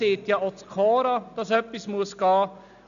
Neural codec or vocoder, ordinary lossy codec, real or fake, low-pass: none; none; real; 7.2 kHz